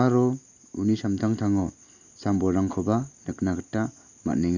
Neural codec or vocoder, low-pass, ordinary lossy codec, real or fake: none; 7.2 kHz; none; real